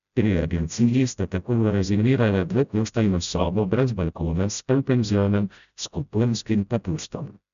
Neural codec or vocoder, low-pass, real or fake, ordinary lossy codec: codec, 16 kHz, 0.5 kbps, FreqCodec, smaller model; 7.2 kHz; fake; none